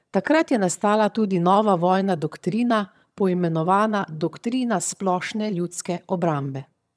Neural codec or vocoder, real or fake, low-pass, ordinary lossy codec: vocoder, 22.05 kHz, 80 mel bands, HiFi-GAN; fake; none; none